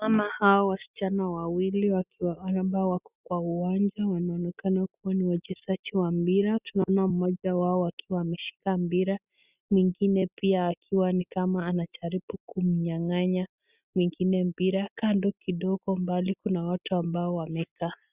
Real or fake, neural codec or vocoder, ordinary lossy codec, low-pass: real; none; Opus, 64 kbps; 3.6 kHz